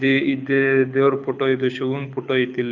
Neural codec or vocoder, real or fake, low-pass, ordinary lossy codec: codec, 24 kHz, 6 kbps, HILCodec; fake; 7.2 kHz; none